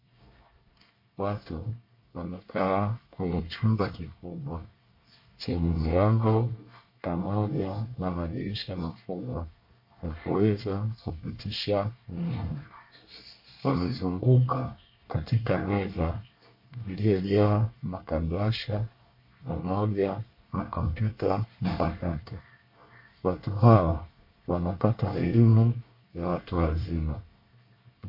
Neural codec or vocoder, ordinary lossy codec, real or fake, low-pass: codec, 24 kHz, 1 kbps, SNAC; MP3, 32 kbps; fake; 5.4 kHz